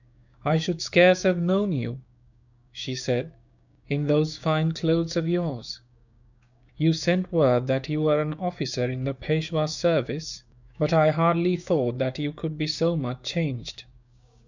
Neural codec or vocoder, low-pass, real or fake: codec, 16 kHz, 6 kbps, DAC; 7.2 kHz; fake